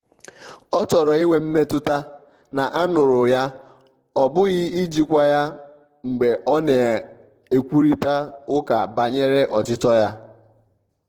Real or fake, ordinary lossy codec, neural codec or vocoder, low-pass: fake; Opus, 16 kbps; vocoder, 44.1 kHz, 128 mel bands, Pupu-Vocoder; 19.8 kHz